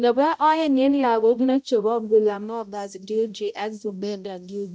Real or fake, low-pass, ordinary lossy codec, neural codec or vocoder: fake; none; none; codec, 16 kHz, 0.5 kbps, X-Codec, HuBERT features, trained on balanced general audio